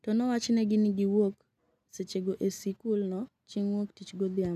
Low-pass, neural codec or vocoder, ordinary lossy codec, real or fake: 14.4 kHz; none; none; real